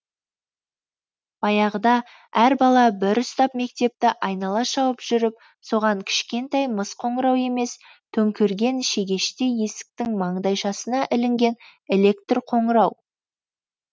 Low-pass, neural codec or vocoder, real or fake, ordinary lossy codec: none; none; real; none